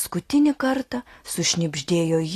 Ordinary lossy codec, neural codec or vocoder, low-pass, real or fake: AAC, 48 kbps; none; 14.4 kHz; real